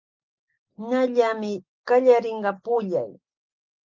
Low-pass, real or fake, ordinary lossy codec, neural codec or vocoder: 7.2 kHz; real; Opus, 32 kbps; none